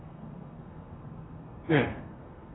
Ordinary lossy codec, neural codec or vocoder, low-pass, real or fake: AAC, 16 kbps; codec, 32 kHz, 1.9 kbps, SNAC; 7.2 kHz; fake